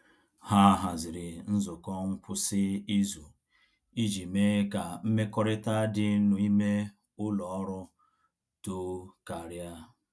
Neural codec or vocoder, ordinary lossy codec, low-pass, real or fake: none; none; none; real